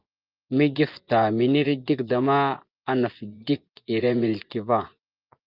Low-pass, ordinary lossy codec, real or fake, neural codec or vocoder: 5.4 kHz; Opus, 32 kbps; fake; vocoder, 24 kHz, 100 mel bands, Vocos